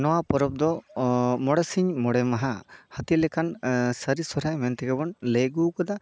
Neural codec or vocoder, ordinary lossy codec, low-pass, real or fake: none; none; none; real